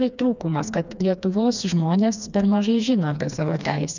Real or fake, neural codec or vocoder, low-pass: fake; codec, 16 kHz, 2 kbps, FreqCodec, smaller model; 7.2 kHz